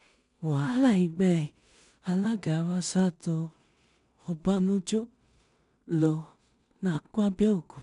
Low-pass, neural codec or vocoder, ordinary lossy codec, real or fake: 10.8 kHz; codec, 16 kHz in and 24 kHz out, 0.4 kbps, LongCat-Audio-Codec, two codebook decoder; none; fake